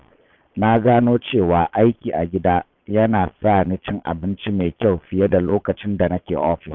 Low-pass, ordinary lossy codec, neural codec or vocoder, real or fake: 7.2 kHz; none; vocoder, 22.05 kHz, 80 mel bands, Vocos; fake